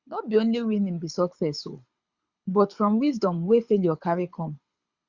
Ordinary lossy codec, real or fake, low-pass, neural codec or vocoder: Opus, 64 kbps; fake; 7.2 kHz; codec, 24 kHz, 6 kbps, HILCodec